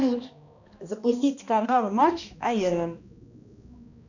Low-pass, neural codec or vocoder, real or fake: 7.2 kHz; codec, 16 kHz, 1 kbps, X-Codec, HuBERT features, trained on balanced general audio; fake